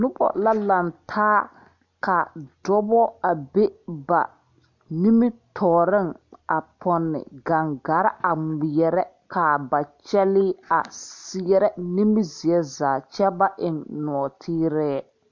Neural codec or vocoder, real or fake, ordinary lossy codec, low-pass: none; real; MP3, 48 kbps; 7.2 kHz